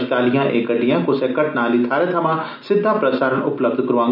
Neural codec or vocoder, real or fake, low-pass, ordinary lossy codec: none; real; 5.4 kHz; none